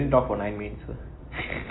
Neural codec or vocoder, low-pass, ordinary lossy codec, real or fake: none; 7.2 kHz; AAC, 16 kbps; real